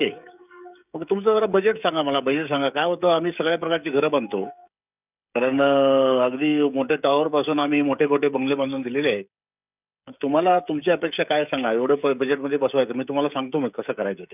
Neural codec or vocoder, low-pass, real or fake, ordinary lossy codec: codec, 16 kHz, 16 kbps, FreqCodec, smaller model; 3.6 kHz; fake; none